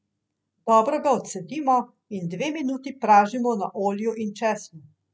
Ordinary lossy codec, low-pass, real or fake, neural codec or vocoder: none; none; real; none